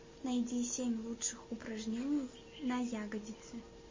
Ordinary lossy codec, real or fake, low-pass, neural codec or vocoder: MP3, 32 kbps; real; 7.2 kHz; none